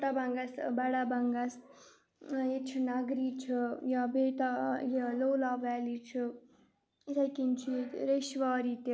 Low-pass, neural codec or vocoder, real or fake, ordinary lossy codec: none; none; real; none